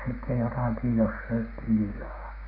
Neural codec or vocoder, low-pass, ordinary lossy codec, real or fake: codec, 16 kHz, 6 kbps, DAC; 5.4 kHz; none; fake